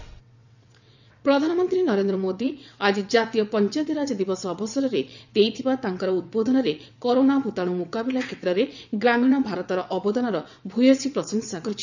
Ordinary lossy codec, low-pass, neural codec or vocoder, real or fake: none; 7.2 kHz; vocoder, 22.05 kHz, 80 mel bands, WaveNeXt; fake